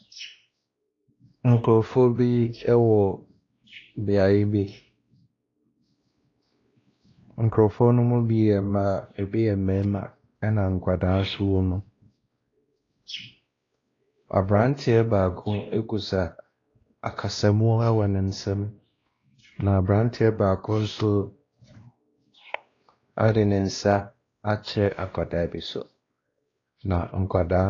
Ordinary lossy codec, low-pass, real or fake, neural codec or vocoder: AAC, 32 kbps; 7.2 kHz; fake; codec, 16 kHz, 1 kbps, X-Codec, WavLM features, trained on Multilingual LibriSpeech